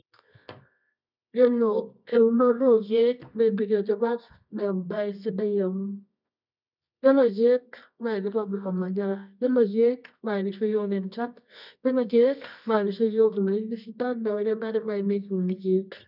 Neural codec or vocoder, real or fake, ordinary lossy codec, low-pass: codec, 24 kHz, 0.9 kbps, WavTokenizer, medium music audio release; fake; none; 5.4 kHz